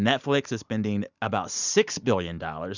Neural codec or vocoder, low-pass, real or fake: none; 7.2 kHz; real